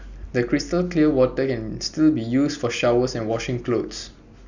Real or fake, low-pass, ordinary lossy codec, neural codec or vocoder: real; 7.2 kHz; none; none